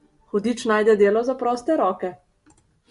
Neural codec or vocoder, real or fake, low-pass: none; real; 10.8 kHz